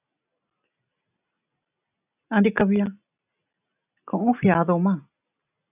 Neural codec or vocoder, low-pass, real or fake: none; 3.6 kHz; real